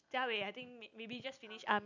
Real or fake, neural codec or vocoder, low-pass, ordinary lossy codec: real; none; 7.2 kHz; none